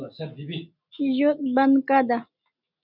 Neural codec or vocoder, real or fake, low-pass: none; real; 5.4 kHz